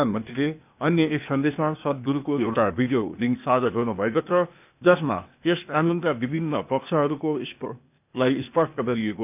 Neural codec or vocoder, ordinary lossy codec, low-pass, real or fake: codec, 16 kHz, 0.8 kbps, ZipCodec; none; 3.6 kHz; fake